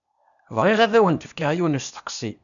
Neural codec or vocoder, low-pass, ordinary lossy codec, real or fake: codec, 16 kHz, 0.8 kbps, ZipCodec; 7.2 kHz; MP3, 96 kbps; fake